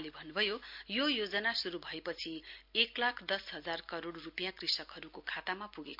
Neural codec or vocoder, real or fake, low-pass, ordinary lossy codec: none; real; 5.4 kHz; MP3, 48 kbps